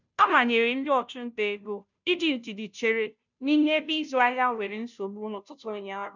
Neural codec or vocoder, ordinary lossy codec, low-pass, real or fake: codec, 16 kHz, 0.5 kbps, FunCodec, trained on Chinese and English, 25 frames a second; none; 7.2 kHz; fake